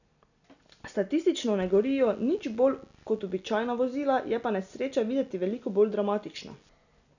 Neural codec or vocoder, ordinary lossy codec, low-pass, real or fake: none; none; 7.2 kHz; real